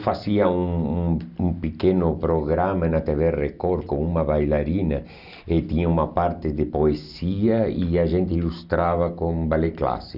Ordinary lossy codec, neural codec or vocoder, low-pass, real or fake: none; none; 5.4 kHz; real